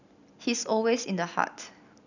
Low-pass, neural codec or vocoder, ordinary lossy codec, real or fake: 7.2 kHz; none; none; real